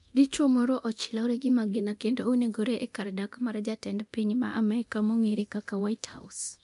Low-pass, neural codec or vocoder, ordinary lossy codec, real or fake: 10.8 kHz; codec, 24 kHz, 0.9 kbps, DualCodec; MP3, 64 kbps; fake